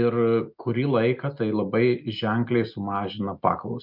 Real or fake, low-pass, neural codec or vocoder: real; 5.4 kHz; none